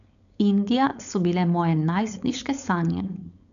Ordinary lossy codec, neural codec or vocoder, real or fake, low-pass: none; codec, 16 kHz, 4.8 kbps, FACodec; fake; 7.2 kHz